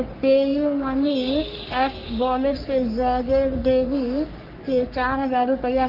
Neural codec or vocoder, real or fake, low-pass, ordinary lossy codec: codec, 44.1 kHz, 3.4 kbps, Pupu-Codec; fake; 5.4 kHz; Opus, 32 kbps